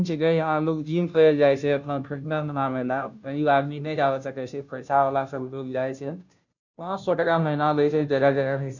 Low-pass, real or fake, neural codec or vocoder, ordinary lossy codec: 7.2 kHz; fake; codec, 16 kHz, 0.5 kbps, FunCodec, trained on Chinese and English, 25 frames a second; none